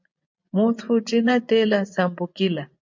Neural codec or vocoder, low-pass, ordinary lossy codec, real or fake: vocoder, 24 kHz, 100 mel bands, Vocos; 7.2 kHz; MP3, 48 kbps; fake